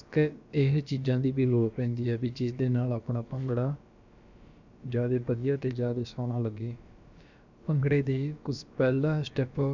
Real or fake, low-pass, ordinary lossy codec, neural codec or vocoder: fake; 7.2 kHz; none; codec, 16 kHz, about 1 kbps, DyCAST, with the encoder's durations